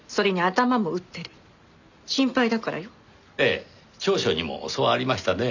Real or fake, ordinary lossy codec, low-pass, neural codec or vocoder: real; none; 7.2 kHz; none